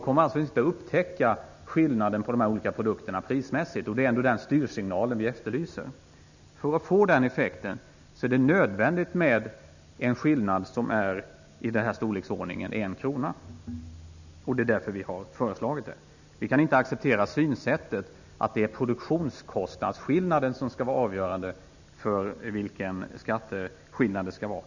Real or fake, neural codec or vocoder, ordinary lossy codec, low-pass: real; none; none; 7.2 kHz